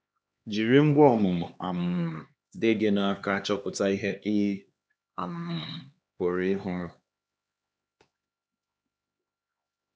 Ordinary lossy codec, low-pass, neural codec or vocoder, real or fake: none; none; codec, 16 kHz, 2 kbps, X-Codec, HuBERT features, trained on LibriSpeech; fake